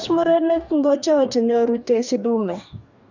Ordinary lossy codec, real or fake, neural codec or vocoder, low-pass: none; fake; codec, 44.1 kHz, 2.6 kbps, DAC; 7.2 kHz